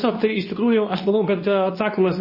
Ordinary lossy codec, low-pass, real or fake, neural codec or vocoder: MP3, 24 kbps; 5.4 kHz; fake; codec, 24 kHz, 0.9 kbps, WavTokenizer, medium speech release version 2